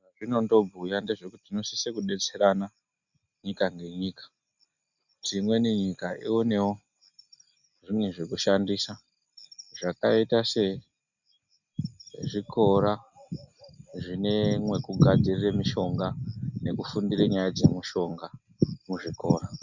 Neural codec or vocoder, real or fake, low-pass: none; real; 7.2 kHz